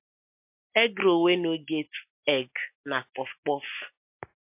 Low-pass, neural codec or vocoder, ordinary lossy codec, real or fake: 3.6 kHz; none; MP3, 32 kbps; real